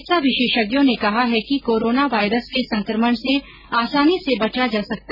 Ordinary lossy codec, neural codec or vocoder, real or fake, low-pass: none; none; real; 5.4 kHz